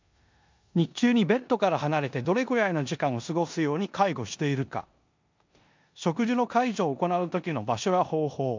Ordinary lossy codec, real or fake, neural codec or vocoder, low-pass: MP3, 64 kbps; fake; codec, 16 kHz in and 24 kHz out, 0.9 kbps, LongCat-Audio-Codec, four codebook decoder; 7.2 kHz